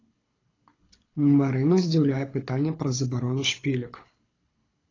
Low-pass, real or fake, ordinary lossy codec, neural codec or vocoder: 7.2 kHz; fake; AAC, 32 kbps; codec, 24 kHz, 6 kbps, HILCodec